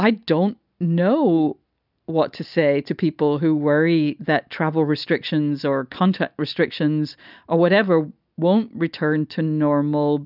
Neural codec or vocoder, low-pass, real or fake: none; 5.4 kHz; real